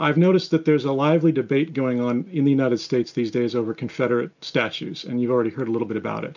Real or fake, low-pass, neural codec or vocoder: real; 7.2 kHz; none